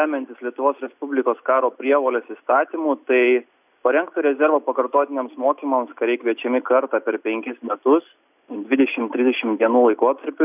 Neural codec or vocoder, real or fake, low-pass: none; real; 3.6 kHz